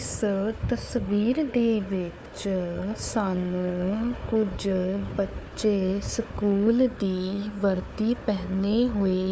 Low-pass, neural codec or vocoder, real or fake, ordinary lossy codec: none; codec, 16 kHz, 4 kbps, FunCodec, trained on Chinese and English, 50 frames a second; fake; none